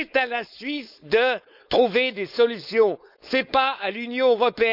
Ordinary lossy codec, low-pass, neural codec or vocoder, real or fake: none; 5.4 kHz; codec, 16 kHz, 4.8 kbps, FACodec; fake